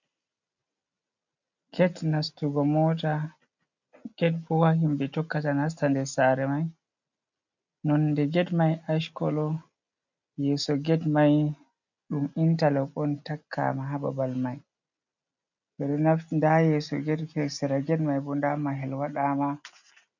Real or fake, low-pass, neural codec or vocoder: real; 7.2 kHz; none